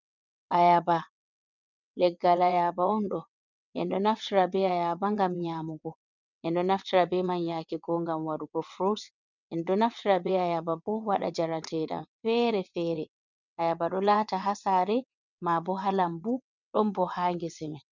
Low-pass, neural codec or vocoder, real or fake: 7.2 kHz; vocoder, 22.05 kHz, 80 mel bands, WaveNeXt; fake